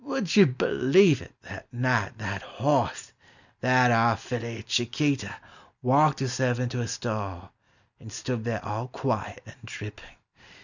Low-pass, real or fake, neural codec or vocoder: 7.2 kHz; real; none